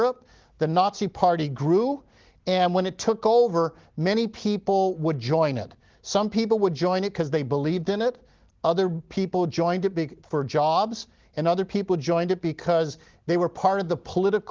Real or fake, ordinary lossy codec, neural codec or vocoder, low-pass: real; Opus, 16 kbps; none; 7.2 kHz